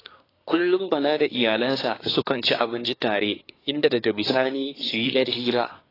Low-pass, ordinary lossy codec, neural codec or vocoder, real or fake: 5.4 kHz; AAC, 24 kbps; codec, 24 kHz, 1 kbps, SNAC; fake